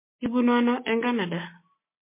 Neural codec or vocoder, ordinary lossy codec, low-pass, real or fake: none; MP3, 24 kbps; 3.6 kHz; real